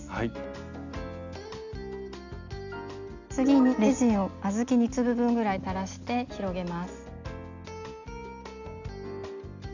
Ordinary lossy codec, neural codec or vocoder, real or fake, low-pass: none; none; real; 7.2 kHz